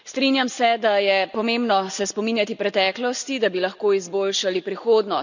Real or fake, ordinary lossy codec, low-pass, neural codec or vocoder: real; none; 7.2 kHz; none